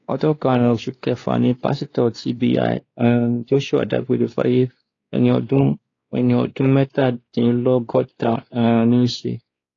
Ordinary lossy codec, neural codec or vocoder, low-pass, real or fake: AAC, 32 kbps; codec, 16 kHz, 2 kbps, X-Codec, WavLM features, trained on Multilingual LibriSpeech; 7.2 kHz; fake